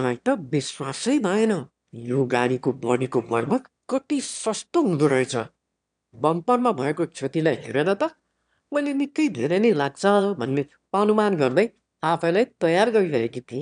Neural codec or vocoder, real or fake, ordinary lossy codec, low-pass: autoencoder, 22.05 kHz, a latent of 192 numbers a frame, VITS, trained on one speaker; fake; none; 9.9 kHz